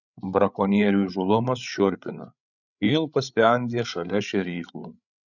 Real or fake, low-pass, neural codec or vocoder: fake; 7.2 kHz; codec, 16 kHz, 8 kbps, FreqCodec, larger model